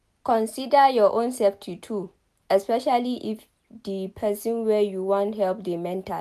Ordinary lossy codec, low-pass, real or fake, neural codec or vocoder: none; 14.4 kHz; real; none